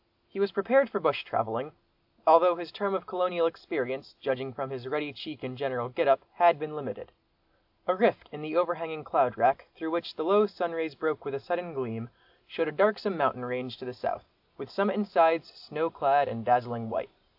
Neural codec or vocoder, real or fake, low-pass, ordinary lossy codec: none; real; 5.4 kHz; AAC, 48 kbps